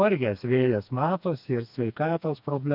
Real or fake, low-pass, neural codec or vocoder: fake; 5.4 kHz; codec, 16 kHz, 2 kbps, FreqCodec, smaller model